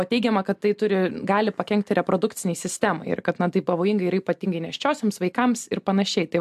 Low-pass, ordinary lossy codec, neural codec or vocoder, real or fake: 14.4 kHz; AAC, 96 kbps; none; real